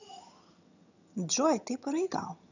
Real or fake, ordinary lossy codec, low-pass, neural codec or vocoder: fake; none; 7.2 kHz; vocoder, 22.05 kHz, 80 mel bands, HiFi-GAN